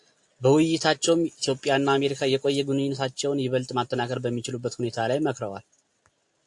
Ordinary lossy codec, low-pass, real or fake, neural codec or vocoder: AAC, 64 kbps; 10.8 kHz; real; none